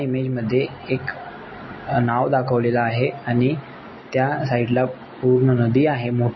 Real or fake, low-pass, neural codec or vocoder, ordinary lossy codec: real; 7.2 kHz; none; MP3, 24 kbps